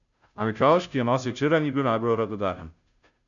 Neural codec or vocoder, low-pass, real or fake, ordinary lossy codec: codec, 16 kHz, 0.5 kbps, FunCodec, trained on Chinese and English, 25 frames a second; 7.2 kHz; fake; MP3, 96 kbps